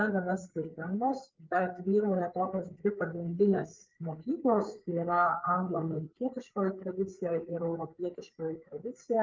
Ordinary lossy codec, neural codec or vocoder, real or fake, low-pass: Opus, 32 kbps; codec, 16 kHz, 4 kbps, FreqCodec, larger model; fake; 7.2 kHz